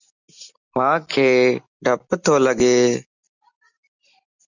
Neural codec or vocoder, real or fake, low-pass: none; real; 7.2 kHz